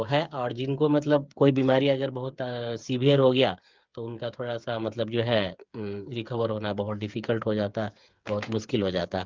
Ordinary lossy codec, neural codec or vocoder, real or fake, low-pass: Opus, 32 kbps; codec, 16 kHz, 8 kbps, FreqCodec, smaller model; fake; 7.2 kHz